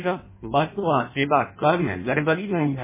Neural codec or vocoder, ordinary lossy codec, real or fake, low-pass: codec, 16 kHz in and 24 kHz out, 0.6 kbps, FireRedTTS-2 codec; MP3, 16 kbps; fake; 3.6 kHz